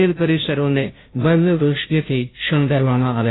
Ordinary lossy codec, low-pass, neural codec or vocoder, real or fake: AAC, 16 kbps; 7.2 kHz; codec, 16 kHz, 0.5 kbps, FunCodec, trained on Chinese and English, 25 frames a second; fake